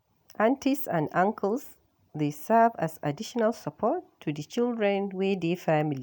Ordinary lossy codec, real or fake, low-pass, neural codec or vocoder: none; real; none; none